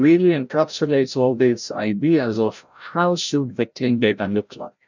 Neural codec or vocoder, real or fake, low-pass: codec, 16 kHz, 0.5 kbps, FreqCodec, larger model; fake; 7.2 kHz